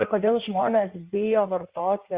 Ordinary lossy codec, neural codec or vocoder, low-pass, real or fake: Opus, 64 kbps; codec, 16 kHz in and 24 kHz out, 1.1 kbps, FireRedTTS-2 codec; 3.6 kHz; fake